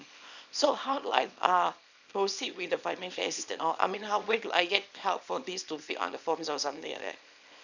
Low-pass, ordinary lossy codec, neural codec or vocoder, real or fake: 7.2 kHz; none; codec, 24 kHz, 0.9 kbps, WavTokenizer, small release; fake